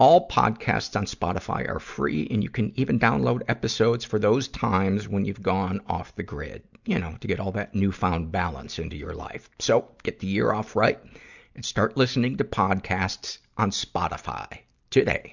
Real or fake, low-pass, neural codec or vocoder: real; 7.2 kHz; none